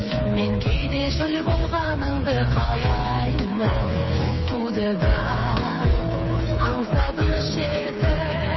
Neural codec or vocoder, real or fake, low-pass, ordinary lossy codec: codec, 16 kHz, 4 kbps, FreqCodec, smaller model; fake; 7.2 kHz; MP3, 24 kbps